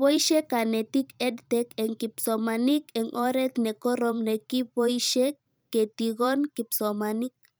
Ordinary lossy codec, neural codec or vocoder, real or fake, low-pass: none; vocoder, 44.1 kHz, 128 mel bands every 512 samples, BigVGAN v2; fake; none